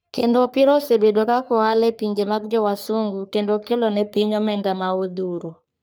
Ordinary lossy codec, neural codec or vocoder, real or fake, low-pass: none; codec, 44.1 kHz, 3.4 kbps, Pupu-Codec; fake; none